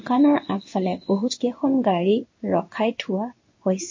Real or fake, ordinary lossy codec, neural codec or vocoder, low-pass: fake; MP3, 32 kbps; codec, 16 kHz in and 24 kHz out, 1 kbps, XY-Tokenizer; 7.2 kHz